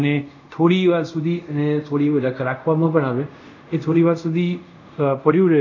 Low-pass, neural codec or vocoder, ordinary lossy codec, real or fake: 7.2 kHz; codec, 24 kHz, 0.5 kbps, DualCodec; none; fake